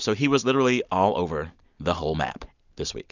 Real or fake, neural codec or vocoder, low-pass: real; none; 7.2 kHz